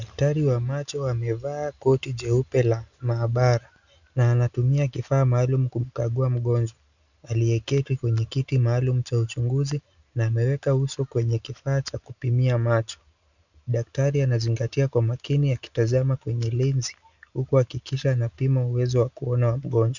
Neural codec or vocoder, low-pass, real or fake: none; 7.2 kHz; real